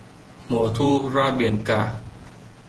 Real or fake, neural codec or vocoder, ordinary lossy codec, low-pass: fake; vocoder, 48 kHz, 128 mel bands, Vocos; Opus, 16 kbps; 10.8 kHz